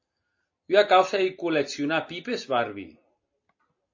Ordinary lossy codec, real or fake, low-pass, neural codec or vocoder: MP3, 32 kbps; real; 7.2 kHz; none